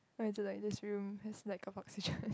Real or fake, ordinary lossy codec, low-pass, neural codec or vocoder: real; none; none; none